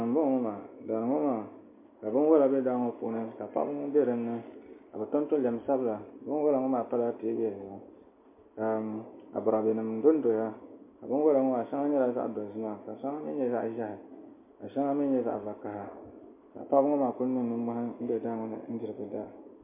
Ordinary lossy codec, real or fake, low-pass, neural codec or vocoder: MP3, 24 kbps; real; 3.6 kHz; none